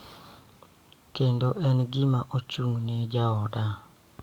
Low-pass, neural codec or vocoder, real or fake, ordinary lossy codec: 19.8 kHz; codec, 44.1 kHz, 7.8 kbps, DAC; fake; Opus, 64 kbps